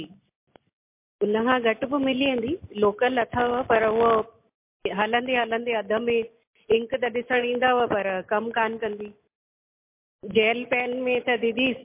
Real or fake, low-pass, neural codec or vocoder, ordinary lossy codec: real; 3.6 kHz; none; MP3, 24 kbps